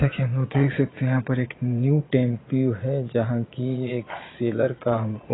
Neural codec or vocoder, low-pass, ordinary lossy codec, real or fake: vocoder, 22.05 kHz, 80 mel bands, Vocos; 7.2 kHz; AAC, 16 kbps; fake